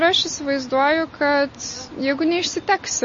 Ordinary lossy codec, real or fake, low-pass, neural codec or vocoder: MP3, 32 kbps; real; 7.2 kHz; none